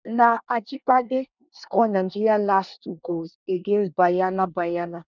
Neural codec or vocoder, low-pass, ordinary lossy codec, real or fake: codec, 24 kHz, 1 kbps, SNAC; 7.2 kHz; none; fake